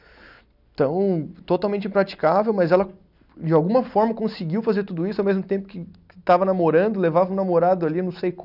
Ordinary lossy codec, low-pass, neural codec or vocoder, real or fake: none; 5.4 kHz; none; real